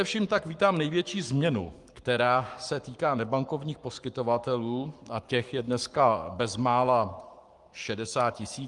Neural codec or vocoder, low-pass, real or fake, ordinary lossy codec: codec, 44.1 kHz, 7.8 kbps, Pupu-Codec; 10.8 kHz; fake; Opus, 32 kbps